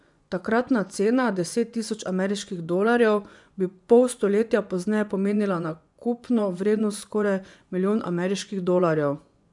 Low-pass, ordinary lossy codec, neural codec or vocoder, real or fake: 10.8 kHz; none; vocoder, 24 kHz, 100 mel bands, Vocos; fake